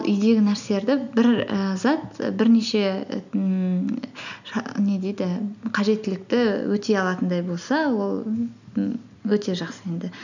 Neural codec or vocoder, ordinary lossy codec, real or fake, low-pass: none; none; real; 7.2 kHz